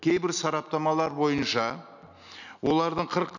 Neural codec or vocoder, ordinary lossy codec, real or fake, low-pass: none; none; real; 7.2 kHz